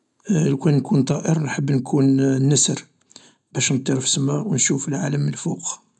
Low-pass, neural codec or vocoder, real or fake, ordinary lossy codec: 10.8 kHz; none; real; none